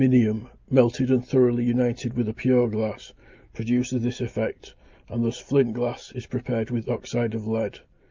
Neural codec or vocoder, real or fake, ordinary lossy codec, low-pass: none; real; Opus, 32 kbps; 7.2 kHz